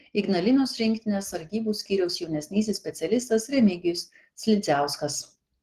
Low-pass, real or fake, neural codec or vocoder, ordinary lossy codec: 14.4 kHz; real; none; Opus, 16 kbps